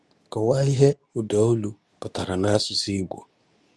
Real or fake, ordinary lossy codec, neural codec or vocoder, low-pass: fake; none; codec, 24 kHz, 0.9 kbps, WavTokenizer, medium speech release version 2; none